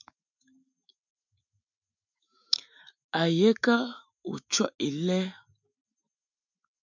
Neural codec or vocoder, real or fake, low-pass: autoencoder, 48 kHz, 128 numbers a frame, DAC-VAE, trained on Japanese speech; fake; 7.2 kHz